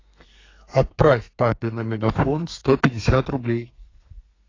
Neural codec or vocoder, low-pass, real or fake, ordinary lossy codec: codec, 32 kHz, 1.9 kbps, SNAC; 7.2 kHz; fake; AAC, 32 kbps